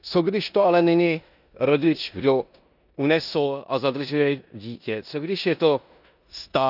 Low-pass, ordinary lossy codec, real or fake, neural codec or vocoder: 5.4 kHz; none; fake; codec, 16 kHz in and 24 kHz out, 0.9 kbps, LongCat-Audio-Codec, four codebook decoder